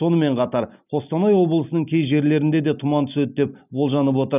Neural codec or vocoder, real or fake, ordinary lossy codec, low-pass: none; real; none; 3.6 kHz